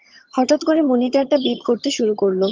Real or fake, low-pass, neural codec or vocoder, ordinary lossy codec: fake; 7.2 kHz; vocoder, 22.05 kHz, 80 mel bands, HiFi-GAN; Opus, 32 kbps